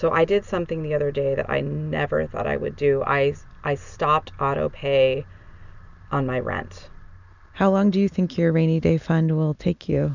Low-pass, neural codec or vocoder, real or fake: 7.2 kHz; none; real